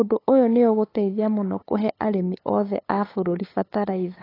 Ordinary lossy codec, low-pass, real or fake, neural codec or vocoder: AAC, 24 kbps; 5.4 kHz; fake; codec, 16 kHz, 8 kbps, FunCodec, trained on LibriTTS, 25 frames a second